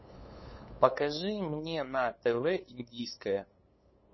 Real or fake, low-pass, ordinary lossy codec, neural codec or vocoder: fake; 7.2 kHz; MP3, 24 kbps; codec, 16 kHz, 8 kbps, FunCodec, trained on LibriTTS, 25 frames a second